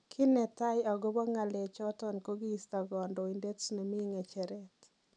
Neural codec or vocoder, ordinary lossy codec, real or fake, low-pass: none; none; real; 9.9 kHz